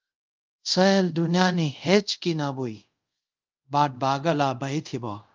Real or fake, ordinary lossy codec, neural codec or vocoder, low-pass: fake; Opus, 24 kbps; codec, 24 kHz, 0.5 kbps, DualCodec; 7.2 kHz